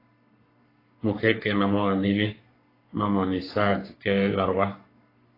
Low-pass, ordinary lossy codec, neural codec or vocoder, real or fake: 5.4 kHz; AAC, 24 kbps; codec, 44.1 kHz, 3.4 kbps, Pupu-Codec; fake